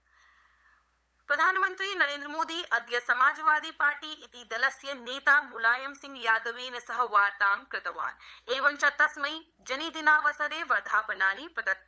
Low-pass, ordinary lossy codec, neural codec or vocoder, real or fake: none; none; codec, 16 kHz, 8 kbps, FunCodec, trained on LibriTTS, 25 frames a second; fake